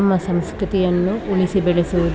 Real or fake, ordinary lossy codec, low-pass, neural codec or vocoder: real; none; none; none